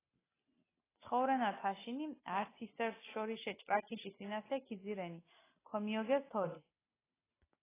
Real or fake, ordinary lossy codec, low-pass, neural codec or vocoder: real; AAC, 16 kbps; 3.6 kHz; none